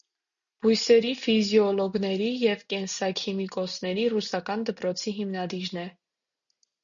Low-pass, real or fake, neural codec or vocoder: 7.2 kHz; real; none